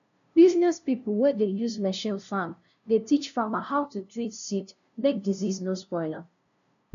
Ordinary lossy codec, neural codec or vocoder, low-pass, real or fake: none; codec, 16 kHz, 0.5 kbps, FunCodec, trained on LibriTTS, 25 frames a second; 7.2 kHz; fake